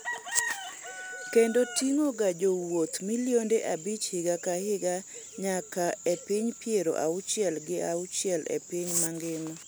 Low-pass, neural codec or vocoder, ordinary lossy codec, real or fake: none; none; none; real